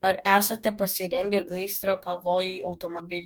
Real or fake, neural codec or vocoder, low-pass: fake; codec, 44.1 kHz, 2.6 kbps, DAC; 19.8 kHz